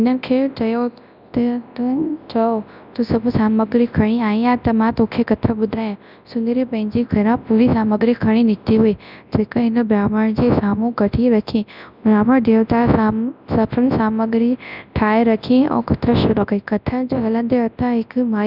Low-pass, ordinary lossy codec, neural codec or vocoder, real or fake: 5.4 kHz; none; codec, 24 kHz, 0.9 kbps, WavTokenizer, large speech release; fake